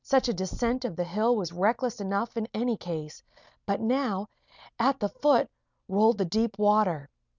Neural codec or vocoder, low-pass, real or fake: none; 7.2 kHz; real